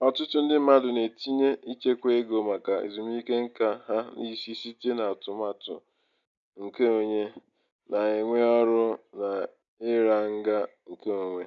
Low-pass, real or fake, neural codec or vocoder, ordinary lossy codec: 7.2 kHz; real; none; none